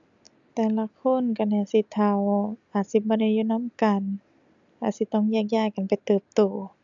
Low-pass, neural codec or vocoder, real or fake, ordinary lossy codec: 7.2 kHz; none; real; none